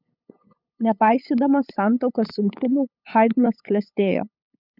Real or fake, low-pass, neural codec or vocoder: fake; 5.4 kHz; codec, 16 kHz, 8 kbps, FunCodec, trained on LibriTTS, 25 frames a second